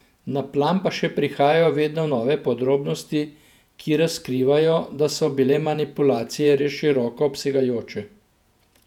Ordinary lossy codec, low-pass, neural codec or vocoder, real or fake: none; 19.8 kHz; vocoder, 48 kHz, 128 mel bands, Vocos; fake